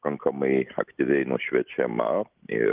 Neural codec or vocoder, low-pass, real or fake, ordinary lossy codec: none; 3.6 kHz; real; Opus, 24 kbps